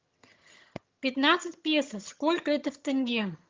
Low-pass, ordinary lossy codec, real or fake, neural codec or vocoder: 7.2 kHz; Opus, 32 kbps; fake; vocoder, 22.05 kHz, 80 mel bands, HiFi-GAN